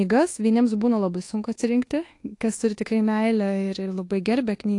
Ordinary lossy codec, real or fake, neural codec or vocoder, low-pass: AAC, 48 kbps; fake; codec, 24 kHz, 1.2 kbps, DualCodec; 10.8 kHz